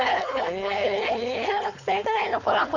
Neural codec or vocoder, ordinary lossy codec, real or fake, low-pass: codec, 16 kHz, 4.8 kbps, FACodec; none; fake; 7.2 kHz